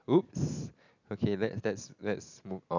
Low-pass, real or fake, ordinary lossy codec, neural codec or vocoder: 7.2 kHz; real; none; none